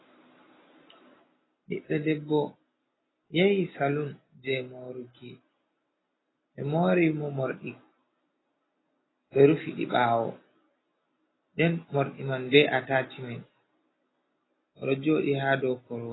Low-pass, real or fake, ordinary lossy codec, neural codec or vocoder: 7.2 kHz; real; AAC, 16 kbps; none